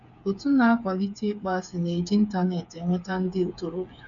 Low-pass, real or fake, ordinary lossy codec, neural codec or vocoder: 7.2 kHz; fake; none; codec, 16 kHz, 8 kbps, FreqCodec, smaller model